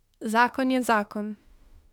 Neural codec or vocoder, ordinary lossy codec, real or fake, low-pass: autoencoder, 48 kHz, 32 numbers a frame, DAC-VAE, trained on Japanese speech; none; fake; 19.8 kHz